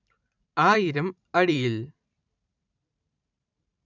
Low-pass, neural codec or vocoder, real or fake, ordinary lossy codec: 7.2 kHz; vocoder, 44.1 kHz, 128 mel bands every 512 samples, BigVGAN v2; fake; none